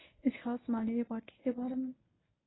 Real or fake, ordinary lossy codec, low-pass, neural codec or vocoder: fake; AAC, 16 kbps; 7.2 kHz; codec, 24 kHz, 0.9 kbps, WavTokenizer, medium speech release version 1